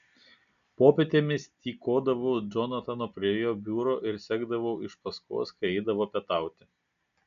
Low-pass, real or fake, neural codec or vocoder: 7.2 kHz; real; none